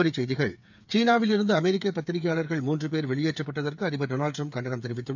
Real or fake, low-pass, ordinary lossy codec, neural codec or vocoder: fake; 7.2 kHz; none; codec, 16 kHz, 8 kbps, FreqCodec, smaller model